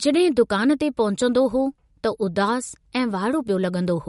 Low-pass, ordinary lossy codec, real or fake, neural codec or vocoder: 19.8 kHz; MP3, 48 kbps; real; none